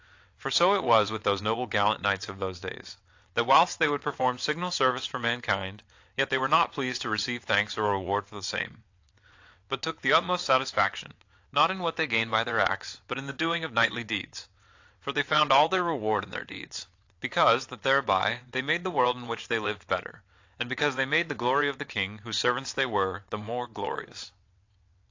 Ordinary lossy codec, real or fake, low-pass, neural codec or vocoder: AAC, 48 kbps; fake; 7.2 kHz; vocoder, 22.05 kHz, 80 mel bands, WaveNeXt